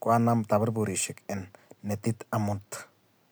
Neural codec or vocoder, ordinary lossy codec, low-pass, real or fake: none; none; none; real